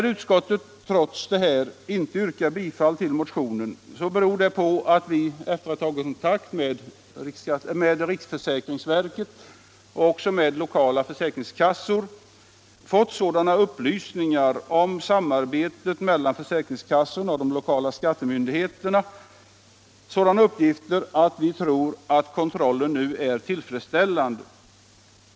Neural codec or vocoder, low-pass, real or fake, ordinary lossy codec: none; none; real; none